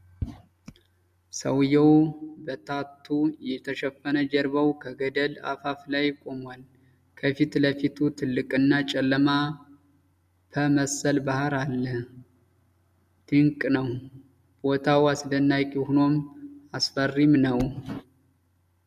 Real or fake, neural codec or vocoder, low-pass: real; none; 14.4 kHz